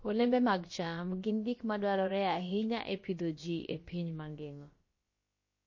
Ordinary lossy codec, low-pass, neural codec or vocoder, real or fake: MP3, 32 kbps; 7.2 kHz; codec, 16 kHz, about 1 kbps, DyCAST, with the encoder's durations; fake